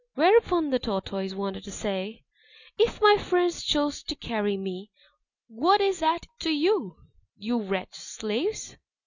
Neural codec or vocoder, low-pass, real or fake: none; 7.2 kHz; real